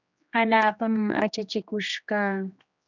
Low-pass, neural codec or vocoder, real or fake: 7.2 kHz; codec, 16 kHz, 2 kbps, X-Codec, HuBERT features, trained on general audio; fake